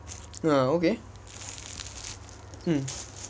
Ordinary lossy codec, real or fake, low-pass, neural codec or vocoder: none; real; none; none